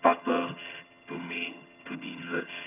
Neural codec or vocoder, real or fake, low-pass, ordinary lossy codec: vocoder, 22.05 kHz, 80 mel bands, HiFi-GAN; fake; 3.6 kHz; none